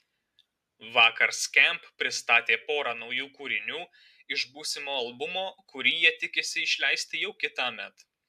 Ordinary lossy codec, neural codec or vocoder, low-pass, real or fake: AAC, 96 kbps; none; 14.4 kHz; real